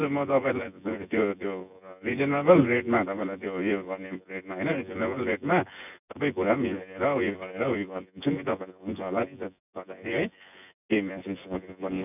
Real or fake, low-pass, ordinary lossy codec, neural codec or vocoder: fake; 3.6 kHz; none; vocoder, 24 kHz, 100 mel bands, Vocos